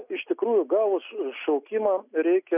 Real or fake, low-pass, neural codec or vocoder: real; 3.6 kHz; none